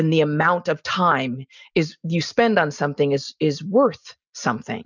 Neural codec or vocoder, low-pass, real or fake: none; 7.2 kHz; real